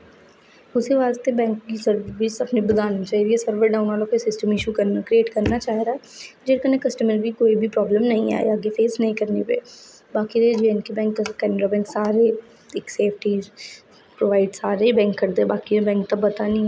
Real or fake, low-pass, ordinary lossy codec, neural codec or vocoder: real; none; none; none